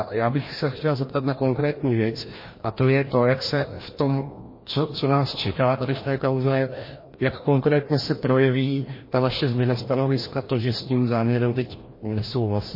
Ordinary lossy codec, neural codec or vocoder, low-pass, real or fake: MP3, 24 kbps; codec, 16 kHz, 1 kbps, FreqCodec, larger model; 5.4 kHz; fake